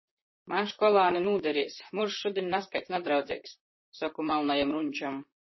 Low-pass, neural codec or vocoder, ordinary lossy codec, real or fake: 7.2 kHz; vocoder, 44.1 kHz, 128 mel bands, Pupu-Vocoder; MP3, 24 kbps; fake